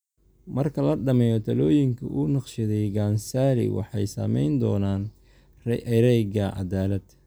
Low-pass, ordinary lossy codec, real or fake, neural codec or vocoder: none; none; real; none